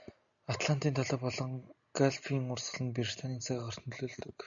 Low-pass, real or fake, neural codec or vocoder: 7.2 kHz; real; none